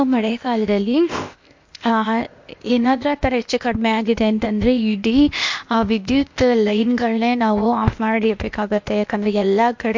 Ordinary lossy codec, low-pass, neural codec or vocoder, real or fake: MP3, 48 kbps; 7.2 kHz; codec, 16 kHz, 0.8 kbps, ZipCodec; fake